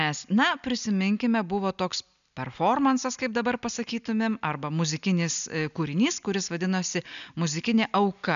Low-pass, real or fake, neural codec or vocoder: 7.2 kHz; real; none